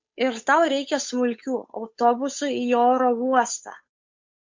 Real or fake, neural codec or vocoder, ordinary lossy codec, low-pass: fake; codec, 16 kHz, 8 kbps, FunCodec, trained on Chinese and English, 25 frames a second; MP3, 48 kbps; 7.2 kHz